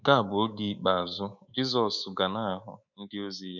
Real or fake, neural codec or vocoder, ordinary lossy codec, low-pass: fake; codec, 24 kHz, 3.1 kbps, DualCodec; none; 7.2 kHz